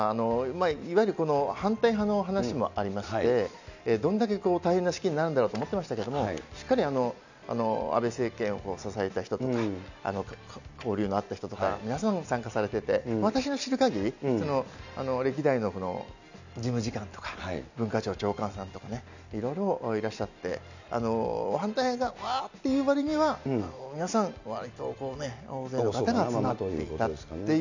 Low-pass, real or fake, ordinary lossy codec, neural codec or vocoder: 7.2 kHz; real; none; none